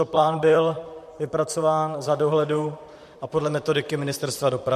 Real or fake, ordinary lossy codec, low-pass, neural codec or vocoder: fake; MP3, 64 kbps; 14.4 kHz; vocoder, 44.1 kHz, 128 mel bands, Pupu-Vocoder